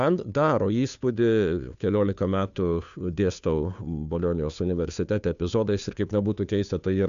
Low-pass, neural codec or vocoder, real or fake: 7.2 kHz; codec, 16 kHz, 2 kbps, FunCodec, trained on Chinese and English, 25 frames a second; fake